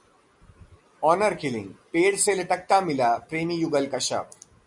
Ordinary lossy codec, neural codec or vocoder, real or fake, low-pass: MP3, 96 kbps; none; real; 10.8 kHz